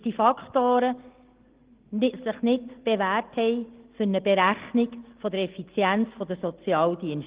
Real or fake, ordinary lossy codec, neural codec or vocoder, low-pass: real; Opus, 16 kbps; none; 3.6 kHz